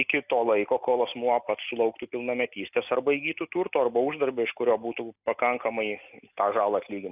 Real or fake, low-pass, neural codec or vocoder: real; 3.6 kHz; none